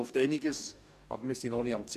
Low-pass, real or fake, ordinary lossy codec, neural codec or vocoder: 14.4 kHz; fake; none; codec, 44.1 kHz, 2.6 kbps, DAC